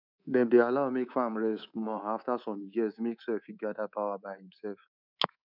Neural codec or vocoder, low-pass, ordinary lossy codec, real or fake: codec, 24 kHz, 3.1 kbps, DualCodec; 5.4 kHz; none; fake